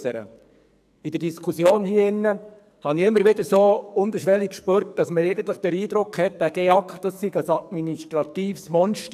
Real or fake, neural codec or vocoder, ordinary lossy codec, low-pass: fake; codec, 44.1 kHz, 2.6 kbps, SNAC; AAC, 96 kbps; 14.4 kHz